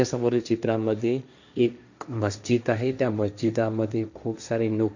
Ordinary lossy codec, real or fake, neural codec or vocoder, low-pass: none; fake; codec, 16 kHz, 1.1 kbps, Voila-Tokenizer; 7.2 kHz